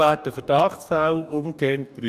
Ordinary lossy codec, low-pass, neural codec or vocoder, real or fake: none; 14.4 kHz; codec, 44.1 kHz, 2.6 kbps, DAC; fake